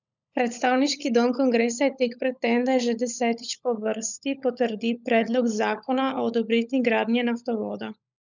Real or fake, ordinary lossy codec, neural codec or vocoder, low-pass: fake; none; codec, 16 kHz, 16 kbps, FunCodec, trained on LibriTTS, 50 frames a second; 7.2 kHz